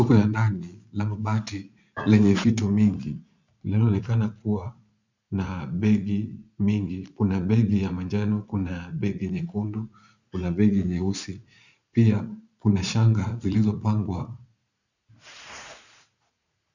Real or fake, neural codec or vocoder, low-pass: fake; vocoder, 44.1 kHz, 80 mel bands, Vocos; 7.2 kHz